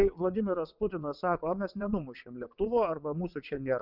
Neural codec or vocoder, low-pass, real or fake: vocoder, 22.05 kHz, 80 mel bands, WaveNeXt; 5.4 kHz; fake